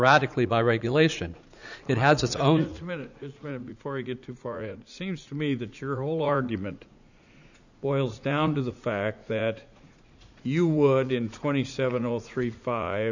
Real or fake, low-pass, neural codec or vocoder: fake; 7.2 kHz; vocoder, 44.1 kHz, 80 mel bands, Vocos